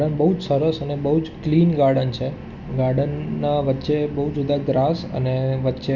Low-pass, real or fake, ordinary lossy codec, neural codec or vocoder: 7.2 kHz; real; none; none